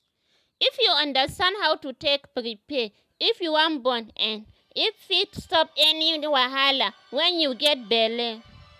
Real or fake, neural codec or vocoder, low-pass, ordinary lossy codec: real; none; 14.4 kHz; none